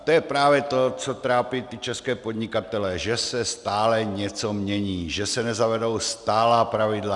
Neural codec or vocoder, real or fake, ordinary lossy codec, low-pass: none; real; Opus, 64 kbps; 10.8 kHz